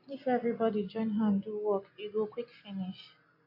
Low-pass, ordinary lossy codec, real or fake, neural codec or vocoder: 5.4 kHz; AAC, 48 kbps; real; none